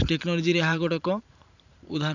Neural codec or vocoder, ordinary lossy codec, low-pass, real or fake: vocoder, 44.1 kHz, 128 mel bands every 512 samples, BigVGAN v2; none; 7.2 kHz; fake